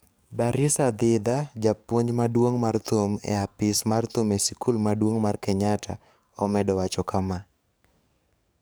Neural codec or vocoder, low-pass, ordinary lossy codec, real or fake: codec, 44.1 kHz, 7.8 kbps, DAC; none; none; fake